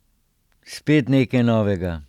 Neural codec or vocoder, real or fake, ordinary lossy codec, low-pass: none; real; none; 19.8 kHz